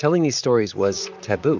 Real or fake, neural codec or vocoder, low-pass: fake; vocoder, 44.1 kHz, 128 mel bands, Pupu-Vocoder; 7.2 kHz